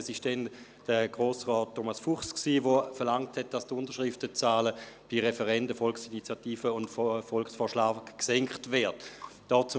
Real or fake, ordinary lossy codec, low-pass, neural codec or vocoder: real; none; none; none